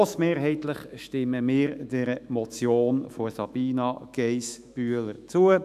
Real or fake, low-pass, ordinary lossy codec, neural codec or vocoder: fake; 14.4 kHz; none; autoencoder, 48 kHz, 128 numbers a frame, DAC-VAE, trained on Japanese speech